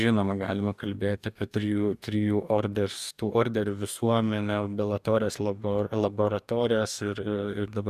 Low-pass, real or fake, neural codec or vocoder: 14.4 kHz; fake; codec, 44.1 kHz, 2.6 kbps, DAC